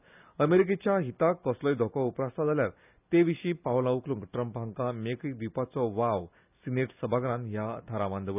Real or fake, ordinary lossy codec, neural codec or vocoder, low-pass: real; none; none; 3.6 kHz